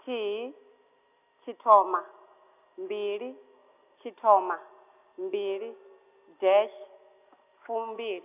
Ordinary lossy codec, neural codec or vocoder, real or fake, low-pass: none; none; real; 3.6 kHz